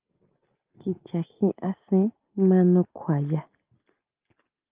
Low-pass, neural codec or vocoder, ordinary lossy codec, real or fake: 3.6 kHz; none; Opus, 32 kbps; real